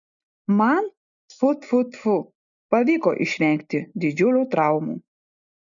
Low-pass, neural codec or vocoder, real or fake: 7.2 kHz; none; real